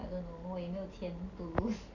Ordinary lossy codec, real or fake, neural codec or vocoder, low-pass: none; real; none; 7.2 kHz